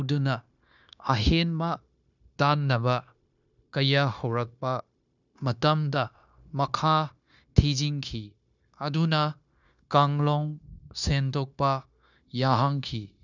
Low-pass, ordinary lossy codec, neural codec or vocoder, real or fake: 7.2 kHz; none; codec, 16 kHz, 0.9 kbps, LongCat-Audio-Codec; fake